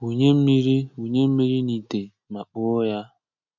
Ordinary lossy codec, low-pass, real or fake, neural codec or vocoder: MP3, 64 kbps; 7.2 kHz; real; none